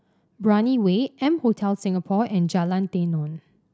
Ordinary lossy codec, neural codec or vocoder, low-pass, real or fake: none; none; none; real